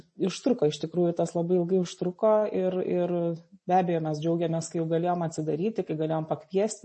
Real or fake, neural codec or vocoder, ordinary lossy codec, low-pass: real; none; MP3, 32 kbps; 10.8 kHz